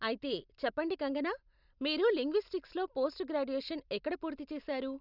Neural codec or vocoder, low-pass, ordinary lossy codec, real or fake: none; 5.4 kHz; none; real